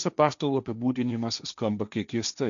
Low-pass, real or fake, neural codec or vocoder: 7.2 kHz; fake; codec, 16 kHz, 1.1 kbps, Voila-Tokenizer